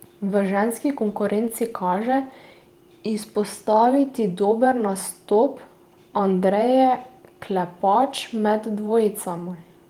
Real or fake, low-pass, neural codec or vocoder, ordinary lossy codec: fake; 19.8 kHz; vocoder, 44.1 kHz, 128 mel bands every 512 samples, BigVGAN v2; Opus, 24 kbps